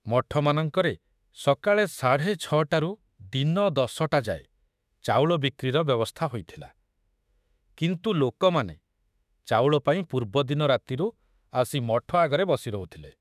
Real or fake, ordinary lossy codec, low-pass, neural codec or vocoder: fake; none; 14.4 kHz; autoencoder, 48 kHz, 32 numbers a frame, DAC-VAE, trained on Japanese speech